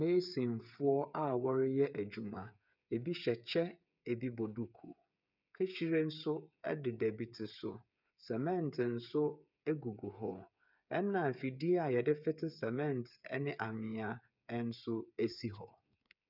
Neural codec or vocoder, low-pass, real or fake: codec, 16 kHz, 8 kbps, FreqCodec, smaller model; 5.4 kHz; fake